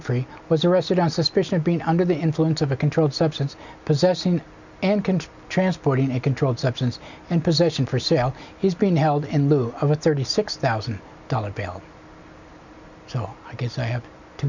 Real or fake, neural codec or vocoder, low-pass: real; none; 7.2 kHz